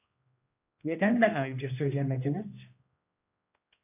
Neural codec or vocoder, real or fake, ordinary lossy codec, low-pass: codec, 16 kHz, 1 kbps, X-Codec, HuBERT features, trained on general audio; fake; AAC, 32 kbps; 3.6 kHz